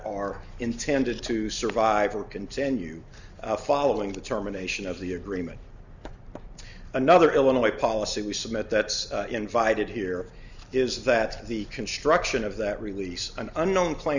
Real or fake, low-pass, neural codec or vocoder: real; 7.2 kHz; none